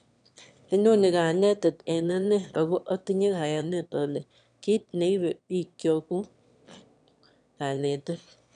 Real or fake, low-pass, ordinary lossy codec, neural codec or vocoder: fake; 9.9 kHz; none; autoencoder, 22.05 kHz, a latent of 192 numbers a frame, VITS, trained on one speaker